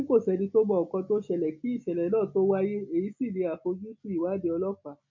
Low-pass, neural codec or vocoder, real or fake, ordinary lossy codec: 7.2 kHz; none; real; MP3, 48 kbps